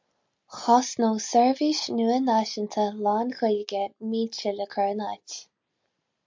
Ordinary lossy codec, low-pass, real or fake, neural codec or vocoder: MP3, 64 kbps; 7.2 kHz; real; none